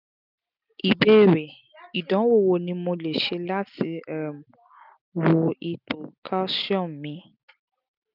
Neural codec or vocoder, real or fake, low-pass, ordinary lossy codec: none; real; 5.4 kHz; none